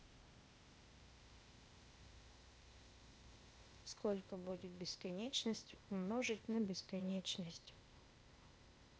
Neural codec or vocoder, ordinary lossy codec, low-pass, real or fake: codec, 16 kHz, 0.8 kbps, ZipCodec; none; none; fake